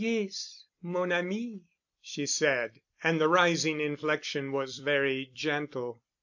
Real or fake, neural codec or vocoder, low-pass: fake; vocoder, 44.1 kHz, 128 mel bands every 256 samples, BigVGAN v2; 7.2 kHz